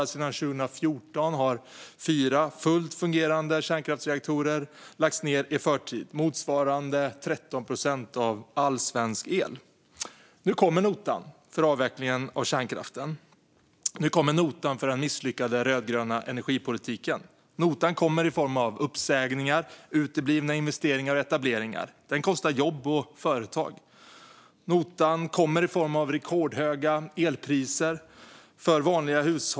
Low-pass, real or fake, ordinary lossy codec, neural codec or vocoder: none; real; none; none